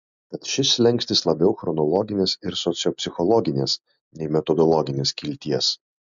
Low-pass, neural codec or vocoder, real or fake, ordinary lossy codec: 7.2 kHz; none; real; MP3, 64 kbps